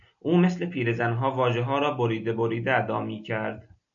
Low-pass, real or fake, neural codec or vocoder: 7.2 kHz; real; none